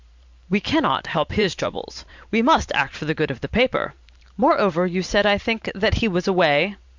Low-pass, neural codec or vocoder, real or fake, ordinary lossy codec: 7.2 kHz; vocoder, 44.1 kHz, 128 mel bands every 512 samples, BigVGAN v2; fake; MP3, 64 kbps